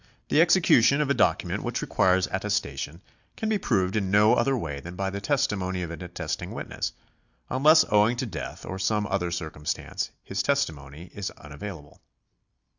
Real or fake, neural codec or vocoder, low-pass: real; none; 7.2 kHz